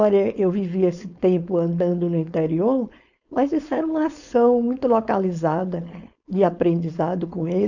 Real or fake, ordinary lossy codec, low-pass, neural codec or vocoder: fake; none; 7.2 kHz; codec, 16 kHz, 4.8 kbps, FACodec